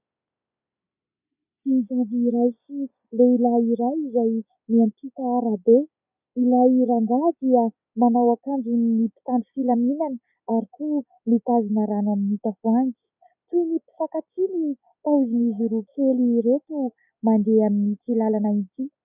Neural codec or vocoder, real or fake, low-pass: none; real; 3.6 kHz